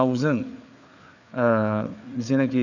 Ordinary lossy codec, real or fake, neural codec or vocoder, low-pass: none; fake; codec, 16 kHz, 4 kbps, FreqCodec, larger model; 7.2 kHz